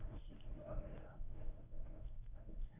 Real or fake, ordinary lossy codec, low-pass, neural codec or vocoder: fake; AAC, 32 kbps; 3.6 kHz; codec, 24 kHz, 0.9 kbps, WavTokenizer, medium speech release version 1